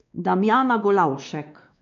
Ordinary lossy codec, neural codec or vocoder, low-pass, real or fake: AAC, 96 kbps; codec, 16 kHz, 2 kbps, X-Codec, WavLM features, trained on Multilingual LibriSpeech; 7.2 kHz; fake